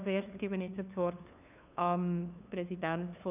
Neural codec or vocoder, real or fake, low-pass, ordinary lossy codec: codec, 16 kHz, 2 kbps, FunCodec, trained on LibriTTS, 25 frames a second; fake; 3.6 kHz; none